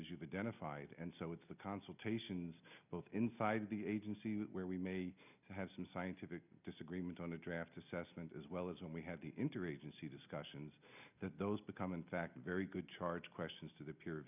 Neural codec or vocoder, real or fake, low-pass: none; real; 3.6 kHz